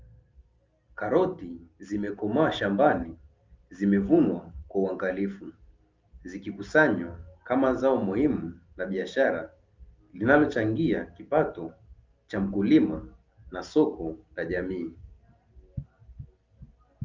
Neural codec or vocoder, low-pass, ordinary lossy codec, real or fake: none; 7.2 kHz; Opus, 32 kbps; real